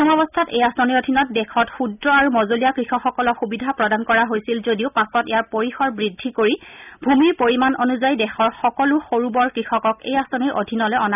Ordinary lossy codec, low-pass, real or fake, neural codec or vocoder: none; 3.6 kHz; real; none